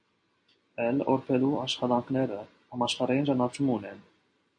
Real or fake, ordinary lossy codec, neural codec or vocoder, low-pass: fake; MP3, 64 kbps; vocoder, 44.1 kHz, 128 mel bands every 256 samples, BigVGAN v2; 9.9 kHz